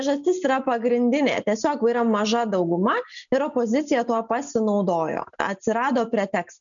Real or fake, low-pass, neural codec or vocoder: real; 7.2 kHz; none